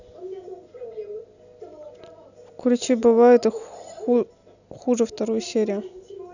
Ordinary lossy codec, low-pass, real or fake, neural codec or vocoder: none; 7.2 kHz; real; none